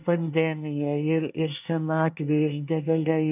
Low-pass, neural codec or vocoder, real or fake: 3.6 kHz; codec, 24 kHz, 1 kbps, SNAC; fake